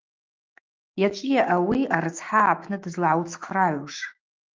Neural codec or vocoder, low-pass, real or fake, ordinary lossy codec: autoencoder, 48 kHz, 128 numbers a frame, DAC-VAE, trained on Japanese speech; 7.2 kHz; fake; Opus, 32 kbps